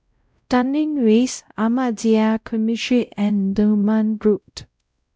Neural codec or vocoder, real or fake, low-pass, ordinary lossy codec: codec, 16 kHz, 0.5 kbps, X-Codec, WavLM features, trained on Multilingual LibriSpeech; fake; none; none